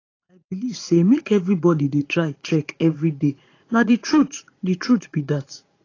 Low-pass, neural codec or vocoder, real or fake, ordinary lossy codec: 7.2 kHz; vocoder, 44.1 kHz, 80 mel bands, Vocos; fake; AAC, 32 kbps